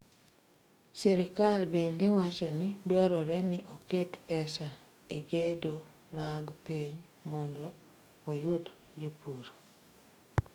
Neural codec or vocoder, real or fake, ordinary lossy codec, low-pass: codec, 44.1 kHz, 2.6 kbps, DAC; fake; none; 19.8 kHz